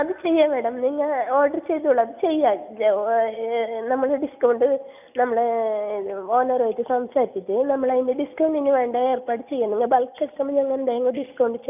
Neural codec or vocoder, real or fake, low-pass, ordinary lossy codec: none; real; 3.6 kHz; none